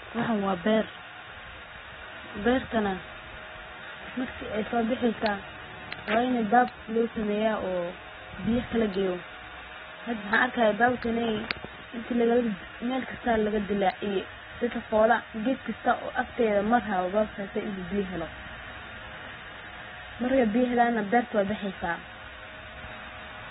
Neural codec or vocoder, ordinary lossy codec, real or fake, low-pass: none; AAC, 16 kbps; real; 9.9 kHz